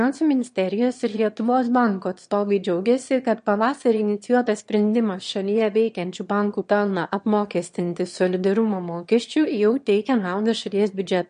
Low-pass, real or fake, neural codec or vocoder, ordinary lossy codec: 9.9 kHz; fake; autoencoder, 22.05 kHz, a latent of 192 numbers a frame, VITS, trained on one speaker; MP3, 48 kbps